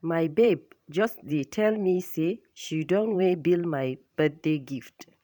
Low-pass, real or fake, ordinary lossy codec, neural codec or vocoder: 19.8 kHz; fake; none; vocoder, 44.1 kHz, 128 mel bands every 512 samples, BigVGAN v2